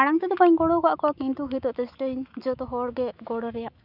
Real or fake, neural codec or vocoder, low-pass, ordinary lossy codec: fake; autoencoder, 48 kHz, 128 numbers a frame, DAC-VAE, trained on Japanese speech; 5.4 kHz; none